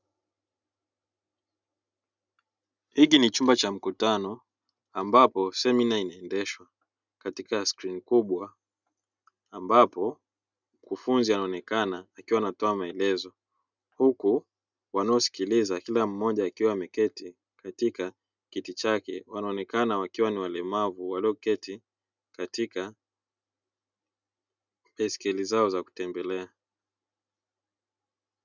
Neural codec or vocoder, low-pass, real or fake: none; 7.2 kHz; real